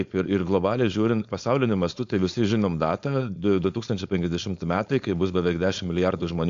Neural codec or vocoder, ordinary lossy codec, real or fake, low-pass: codec, 16 kHz, 4.8 kbps, FACodec; AAC, 64 kbps; fake; 7.2 kHz